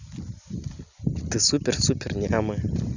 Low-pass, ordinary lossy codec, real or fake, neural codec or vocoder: 7.2 kHz; none; real; none